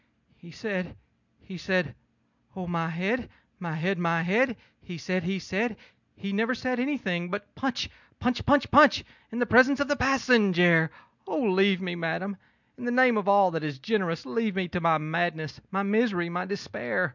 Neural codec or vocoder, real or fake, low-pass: none; real; 7.2 kHz